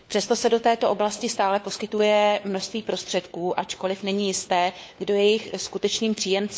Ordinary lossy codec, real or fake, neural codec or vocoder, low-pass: none; fake; codec, 16 kHz, 4 kbps, FunCodec, trained on LibriTTS, 50 frames a second; none